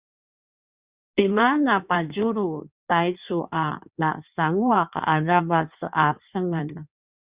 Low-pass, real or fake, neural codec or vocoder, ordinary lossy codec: 3.6 kHz; fake; codec, 16 kHz in and 24 kHz out, 1.1 kbps, FireRedTTS-2 codec; Opus, 64 kbps